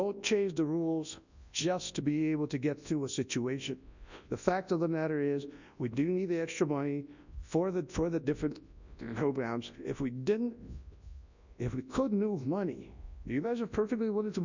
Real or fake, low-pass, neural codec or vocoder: fake; 7.2 kHz; codec, 24 kHz, 0.9 kbps, WavTokenizer, large speech release